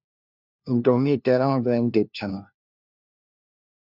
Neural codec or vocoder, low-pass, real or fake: codec, 16 kHz, 1 kbps, FunCodec, trained on LibriTTS, 50 frames a second; 5.4 kHz; fake